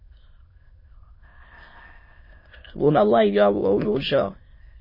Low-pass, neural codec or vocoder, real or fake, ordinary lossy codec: 5.4 kHz; autoencoder, 22.05 kHz, a latent of 192 numbers a frame, VITS, trained on many speakers; fake; MP3, 24 kbps